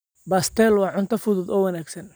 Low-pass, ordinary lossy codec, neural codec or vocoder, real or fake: none; none; none; real